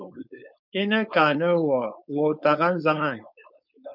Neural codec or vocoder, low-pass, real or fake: codec, 16 kHz, 4.8 kbps, FACodec; 5.4 kHz; fake